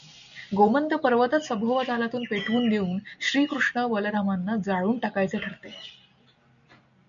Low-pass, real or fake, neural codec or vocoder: 7.2 kHz; real; none